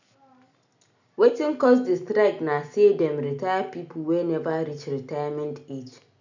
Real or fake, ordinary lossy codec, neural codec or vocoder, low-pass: real; none; none; 7.2 kHz